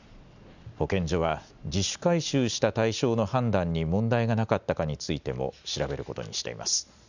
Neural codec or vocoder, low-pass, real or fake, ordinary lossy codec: none; 7.2 kHz; real; none